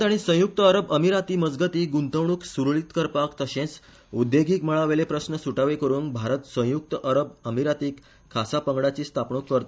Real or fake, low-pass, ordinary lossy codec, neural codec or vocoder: real; none; none; none